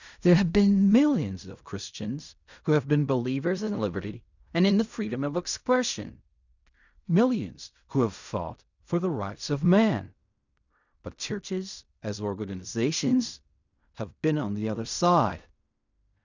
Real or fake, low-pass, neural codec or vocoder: fake; 7.2 kHz; codec, 16 kHz in and 24 kHz out, 0.4 kbps, LongCat-Audio-Codec, fine tuned four codebook decoder